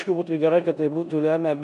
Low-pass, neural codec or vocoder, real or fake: 10.8 kHz; codec, 16 kHz in and 24 kHz out, 0.9 kbps, LongCat-Audio-Codec, four codebook decoder; fake